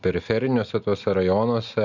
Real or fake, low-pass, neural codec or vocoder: real; 7.2 kHz; none